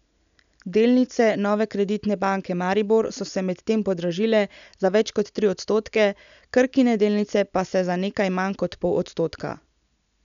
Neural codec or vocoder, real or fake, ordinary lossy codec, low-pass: none; real; none; 7.2 kHz